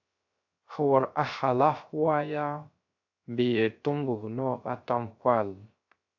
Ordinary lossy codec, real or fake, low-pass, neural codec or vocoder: AAC, 48 kbps; fake; 7.2 kHz; codec, 16 kHz, 0.3 kbps, FocalCodec